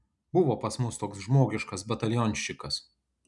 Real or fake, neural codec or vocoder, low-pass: real; none; 10.8 kHz